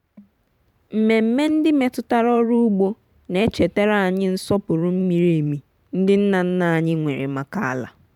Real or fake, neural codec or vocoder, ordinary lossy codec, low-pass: fake; vocoder, 44.1 kHz, 128 mel bands every 512 samples, BigVGAN v2; none; 19.8 kHz